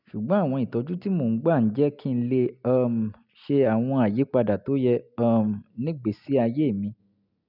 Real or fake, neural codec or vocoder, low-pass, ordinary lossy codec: real; none; 5.4 kHz; none